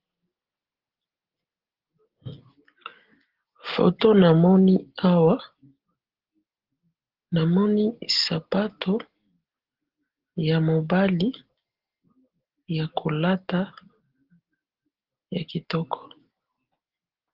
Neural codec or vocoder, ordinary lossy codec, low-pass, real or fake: none; Opus, 16 kbps; 5.4 kHz; real